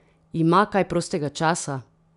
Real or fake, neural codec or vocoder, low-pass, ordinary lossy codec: real; none; 10.8 kHz; none